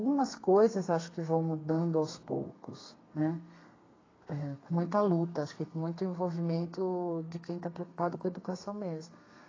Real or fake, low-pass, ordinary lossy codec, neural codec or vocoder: fake; 7.2 kHz; AAC, 32 kbps; codec, 32 kHz, 1.9 kbps, SNAC